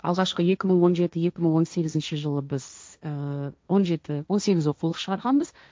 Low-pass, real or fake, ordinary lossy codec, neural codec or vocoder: none; fake; none; codec, 16 kHz, 1.1 kbps, Voila-Tokenizer